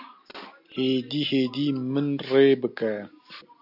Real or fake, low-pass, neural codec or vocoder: real; 5.4 kHz; none